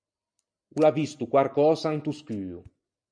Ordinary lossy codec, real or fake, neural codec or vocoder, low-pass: MP3, 96 kbps; real; none; 9.9 kHz